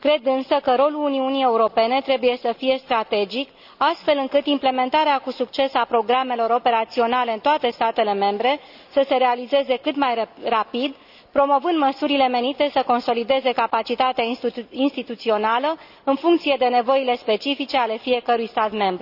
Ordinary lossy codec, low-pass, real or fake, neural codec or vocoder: none; 5.4 kHz; real; none